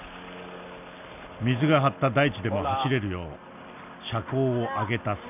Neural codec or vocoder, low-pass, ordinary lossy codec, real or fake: none; 3.6 kHz; none; real